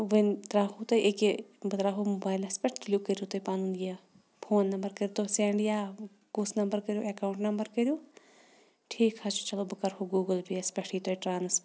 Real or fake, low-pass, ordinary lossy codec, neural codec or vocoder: real; none; none; none